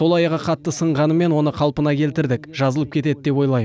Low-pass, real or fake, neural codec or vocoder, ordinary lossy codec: none; real; none; none